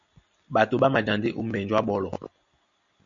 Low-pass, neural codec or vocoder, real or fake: 7.2 kHz; none; real